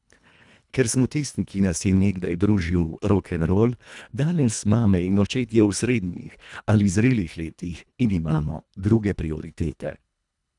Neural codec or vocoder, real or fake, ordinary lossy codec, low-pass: codec, 24 kHz, 1.5 kbps, HILCodec; fake; none; 10.8 kHz